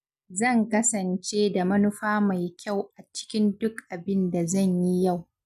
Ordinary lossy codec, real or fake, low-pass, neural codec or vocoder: none; real; 14.4 kHz; none